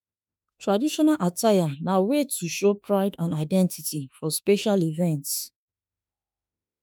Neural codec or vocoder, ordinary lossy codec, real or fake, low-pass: autoencoder, 48 kHz, 32 numbers a frame, DAC-VAE, trained on Japanese speech; none; fake; none